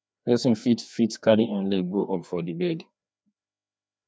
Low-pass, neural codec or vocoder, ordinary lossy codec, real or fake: none; codec, 16 kHz, 2 kbps, FreqCodec, larger model; none; fake